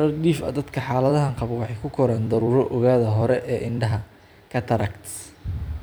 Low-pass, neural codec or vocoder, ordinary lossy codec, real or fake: none; vocoder, 44.1 kHz, 128 mel bands every 256 samples, BigVGAN v2; none; fake